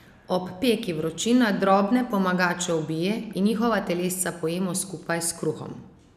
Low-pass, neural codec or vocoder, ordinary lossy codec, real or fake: 14.4 kHz; none; none; real